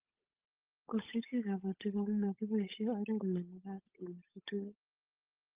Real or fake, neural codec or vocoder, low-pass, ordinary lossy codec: fake; codec, 16 kHz, 8 kbps, FunCodec, trained on Chinese and English, 25 frames a second; 3.6 kHz; Opus, 32 kbps